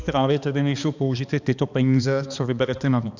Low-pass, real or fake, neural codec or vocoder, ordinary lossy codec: 7.2 kHz; fake; codec, 16 kHz, 2 kbps, X-Codec, HuBERT features, trained on balanced general audio; Opus, 64 kbps